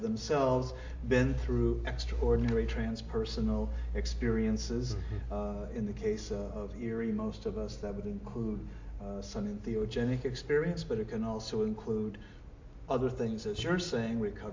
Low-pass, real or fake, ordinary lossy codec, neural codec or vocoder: 7.2 kHz; real; MP3, 48 kbps; none